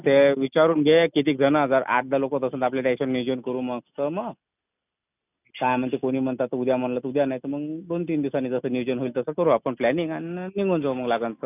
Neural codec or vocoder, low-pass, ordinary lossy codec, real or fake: none; 3.6 kHz; none; real